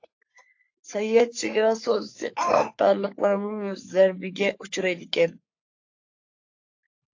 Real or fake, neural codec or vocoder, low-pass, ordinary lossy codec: fake; codec, 44.1 kHz, 3.4 kbps, Pupu-Codec; 7.2 kHz; AAC, 48 kbps